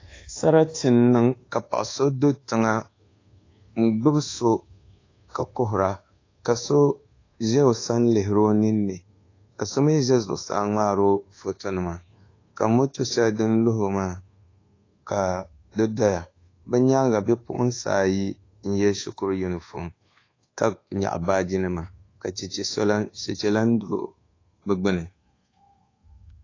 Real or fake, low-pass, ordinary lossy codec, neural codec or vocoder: fake; 7.2 kHz; AAC, 32 kbps; codec, 24 kHz, 1.2 kbps, DualCodec